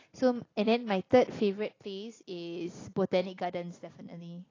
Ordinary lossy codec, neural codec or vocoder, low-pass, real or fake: AAC, 32 kbps; none; 7.2 kHz; real